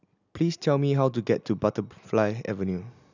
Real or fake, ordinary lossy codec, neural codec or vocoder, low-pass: real; none; none; 7.2 kHz